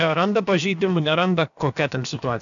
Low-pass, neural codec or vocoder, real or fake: 7.2 kHz; codec, 16 kHz, about 1 kbps, DyCAST, with the encoder's durations; fake